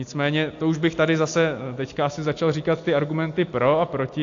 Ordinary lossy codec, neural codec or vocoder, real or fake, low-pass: AAC, 48 kbps; none; real; 7.2 kHz